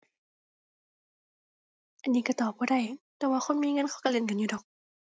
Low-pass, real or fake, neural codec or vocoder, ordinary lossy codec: none; real; none; none